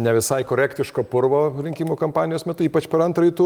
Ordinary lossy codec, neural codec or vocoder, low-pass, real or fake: Opus, 32 kbps; none; 19.8 kHz; real